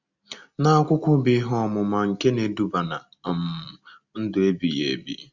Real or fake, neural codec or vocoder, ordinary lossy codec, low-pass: real; none; Opus, 64 kbps; 7.2 kHz